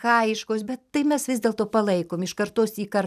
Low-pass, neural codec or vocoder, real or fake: 14.4 kHz; vocoder, 44.1 kHz, 128 mel bands every 256 samples, BigVGAN v2; fake